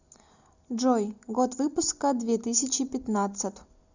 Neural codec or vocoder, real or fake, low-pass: none; real; 7.2 kHz